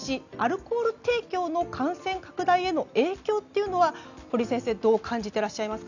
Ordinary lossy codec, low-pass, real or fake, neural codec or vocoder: none; 7.2 kHz; real; none